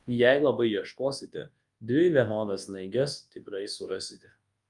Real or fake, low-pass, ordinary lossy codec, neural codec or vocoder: fake; 10.8 kHz; Opus, 32 kbps; codec, 24 kHz, 0.9 kbps, WavTokenizer, large speech release